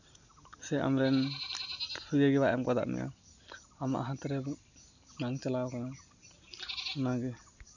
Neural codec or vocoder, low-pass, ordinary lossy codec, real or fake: none; 7.2 kHz; none; real